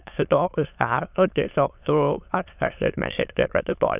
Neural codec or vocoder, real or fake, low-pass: autoencoder, 22.05 kHz, a latent of 192 numbers a frame, VITS, trained on many speakers; fake; 3.6 kHz